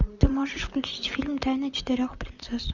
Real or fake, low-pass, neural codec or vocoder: fake; 7.2 kHz; vocoder, 44.1 kHz, 128 mel bands, Pupu-Vocoder